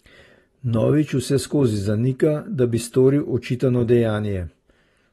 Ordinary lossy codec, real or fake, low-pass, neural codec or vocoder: AAC, 32 kbps; real; 19.8 kHz; none